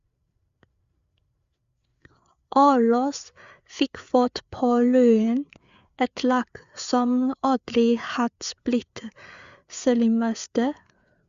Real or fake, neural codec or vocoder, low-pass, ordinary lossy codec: fake; codec, 16 kHz, 4 kbps, FreqCodec, larger model; 7.2 kHz; Opus, 64 kbps